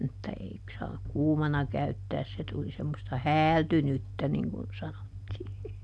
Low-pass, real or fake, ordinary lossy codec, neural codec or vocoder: none; real; none; none